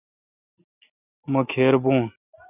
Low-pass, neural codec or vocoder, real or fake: 3.6 kHz; none; real